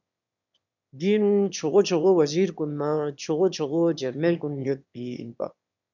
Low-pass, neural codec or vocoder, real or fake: 7.2 kHz; autoencoder, 22.05 kHz, a latent of 192 numbers a frame, VITS, trained on one speaker; fake